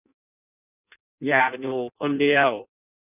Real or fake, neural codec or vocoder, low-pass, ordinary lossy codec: fake; codec, 16 kHz in and 24 kHz out, 0.6 kbps, FireRedTTS-2 codec; 3.6 kHz; none